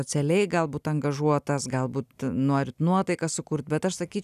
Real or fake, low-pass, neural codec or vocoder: real; 14.4 kHz; none